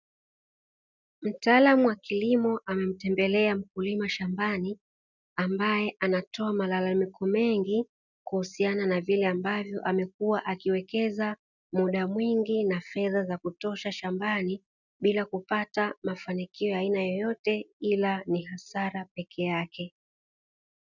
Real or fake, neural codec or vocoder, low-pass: real; none; 7.2 kHz